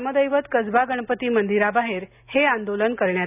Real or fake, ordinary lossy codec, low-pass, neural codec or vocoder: real; none; 3.6 kHz; none